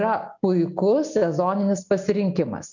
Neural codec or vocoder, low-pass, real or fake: none; 7.2 kHz; real